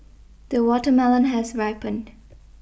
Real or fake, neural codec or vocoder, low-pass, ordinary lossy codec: real; none; none; none